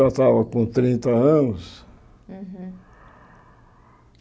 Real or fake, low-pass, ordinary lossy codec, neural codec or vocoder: real; none; none; none